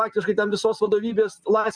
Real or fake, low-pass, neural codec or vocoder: real; 9.9 kHz; none